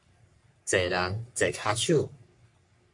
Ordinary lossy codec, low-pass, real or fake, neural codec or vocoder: MP3, 64 kbps; 10.8 kHz; fake; codec, 44.1 kHz, 3.4 kbps, Pupu-Codec